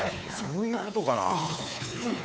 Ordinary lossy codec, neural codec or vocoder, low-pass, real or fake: none; codec, 16 kHz, 4 kbps, X-Codec, WavLM features, trained on Multilingual LibriSpeech; none; fake